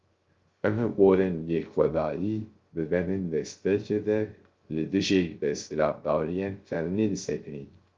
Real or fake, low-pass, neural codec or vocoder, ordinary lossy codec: fake; 7.2 kHz; codec, 16 kHz, 0.3 kbps, FocalCodec; Opus, 32 kbps